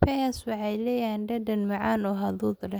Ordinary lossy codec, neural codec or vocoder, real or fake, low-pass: none; none; real; none